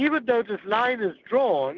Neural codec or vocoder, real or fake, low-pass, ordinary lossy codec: none; real; 7.2 kHz; Opus, 32 kbps